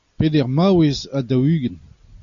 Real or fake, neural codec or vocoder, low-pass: real; none; 7.2 kHz